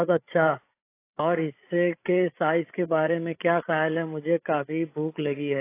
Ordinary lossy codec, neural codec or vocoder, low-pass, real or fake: AAC, 24 kbps; codec, 16 kHz, 8 kbps, FreqCodec, larger model; 3.6 kHz; fake